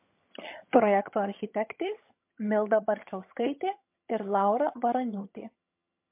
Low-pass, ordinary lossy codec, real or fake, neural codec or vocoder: 3.6 kHz; MP3, 32 kbps; fake; vocoder, 22.05 kHz, 80 mel bands, HiFi-GAN